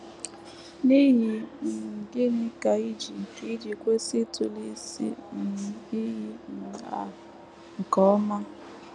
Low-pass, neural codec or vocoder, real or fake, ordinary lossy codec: 10.8 kHz; none; real; none